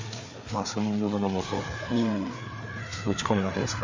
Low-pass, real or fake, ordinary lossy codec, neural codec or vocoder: 7.2 kHz; fake; MP3, 48 kbps; codec, 16 kHz, 4 kbps, FreqCodec, larger model